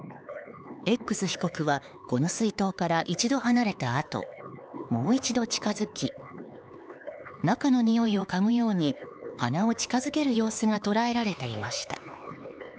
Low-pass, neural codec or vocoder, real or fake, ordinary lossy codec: none; codec, 16 kHz, 4 kbps, X-Codec, HuBERT features, trained on LibriSpeech; fake; none